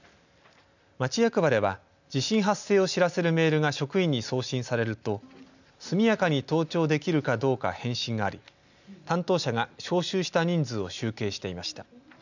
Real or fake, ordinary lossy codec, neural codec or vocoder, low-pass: real; none; none; 7.2 kHz